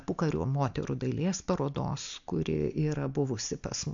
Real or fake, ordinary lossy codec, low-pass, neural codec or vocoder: real; AAC, 64 kbps; 7.2 kHz; none